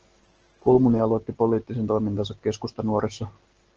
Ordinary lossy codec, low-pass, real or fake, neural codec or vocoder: Opus, 16 kbps; 7.2 kHz; real; none